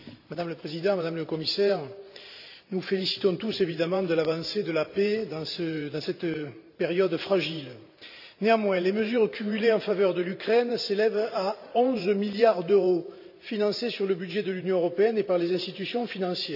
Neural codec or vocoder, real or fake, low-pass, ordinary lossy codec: vocoder, 44.1 kHz, 128 mel bands every 512 samples, BigVGAN v2; fake; 5.4 kHz; none